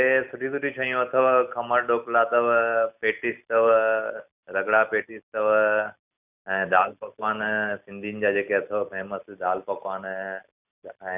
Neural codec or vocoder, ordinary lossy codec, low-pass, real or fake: none; none; 3.6 kHz; real